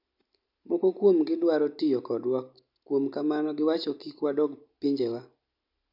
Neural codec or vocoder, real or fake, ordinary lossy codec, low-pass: none; real; none; 5.4 kHz